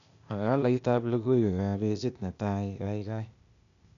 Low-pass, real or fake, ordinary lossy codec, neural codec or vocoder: 7.2 kHz; fake; none; codec, 16 kHz, 0.8 kbps, ZipCodec